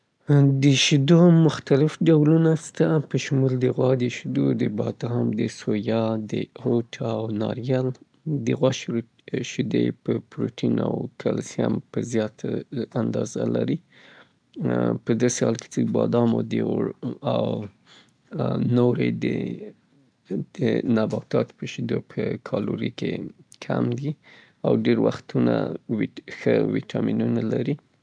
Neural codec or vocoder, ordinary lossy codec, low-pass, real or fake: none; none; 9.9 kHz; real